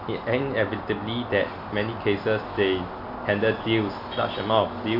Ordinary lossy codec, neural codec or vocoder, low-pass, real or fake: none; none; 5.4 kHz; real